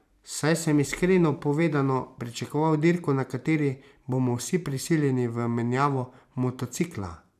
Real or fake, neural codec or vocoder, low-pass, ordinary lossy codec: fake; vocoder, 44.1 kHz, 128 mel bands every 512 samples, BigVGAN v2; 14.4 kHz; none